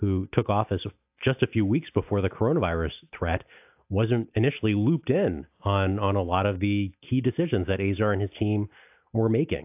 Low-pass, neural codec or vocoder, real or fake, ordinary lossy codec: 3.6 kHz; none; real; AAC, 32 kbps